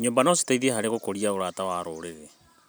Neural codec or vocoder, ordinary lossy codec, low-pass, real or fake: none; none; none; real